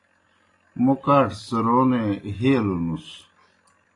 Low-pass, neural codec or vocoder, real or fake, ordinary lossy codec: 10.8 kHz; none; real; AAC, 32 kbps